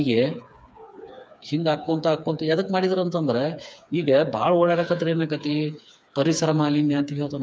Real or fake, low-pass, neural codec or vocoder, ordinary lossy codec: fake; none; codec, 16 kHz, 4 kbps, FreqCodec, smaller model; none